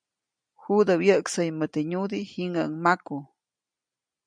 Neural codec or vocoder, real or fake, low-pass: none; real; 9.9 kHz